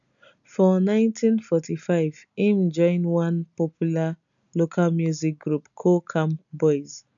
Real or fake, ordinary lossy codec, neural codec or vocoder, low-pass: real; none; none; 7.2 kHz